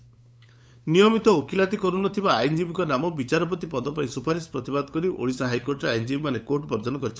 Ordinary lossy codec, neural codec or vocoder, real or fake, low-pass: none; codec, 16 kHz, 8 kbps, FunCodec, trained on LibriTTS, 25 frames a second; fake; none